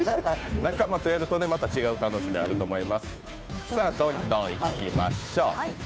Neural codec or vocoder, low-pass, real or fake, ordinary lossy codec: codec, 16 kHz, 2 kbps, FunCodec, trained on Chinese and English, 25 frames a second; none; fake; none